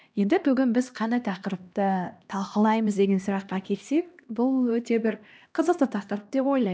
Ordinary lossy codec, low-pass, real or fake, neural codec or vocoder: none; none; fake; codec, 16 kHz, 1 kbps, X-Codec, HuBERT features, trained on LibriSpeech